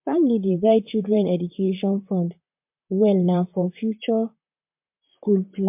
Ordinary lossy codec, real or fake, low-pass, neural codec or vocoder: MP3, 32 kbps; fake; 3.6 kHz; vocoder, 44.1 kHz, 128 mel bands, Pupu-Vocoder